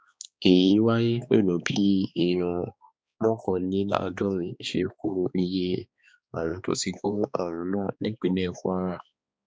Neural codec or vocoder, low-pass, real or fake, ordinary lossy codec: codec, 16 kHz, 2 kbps, X-Codec, HuBERT features, trained on balanced general audio; none; fake; none